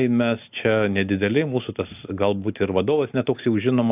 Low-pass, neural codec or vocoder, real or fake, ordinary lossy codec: 3.6 kHz; none; real; AAC, 32 kbps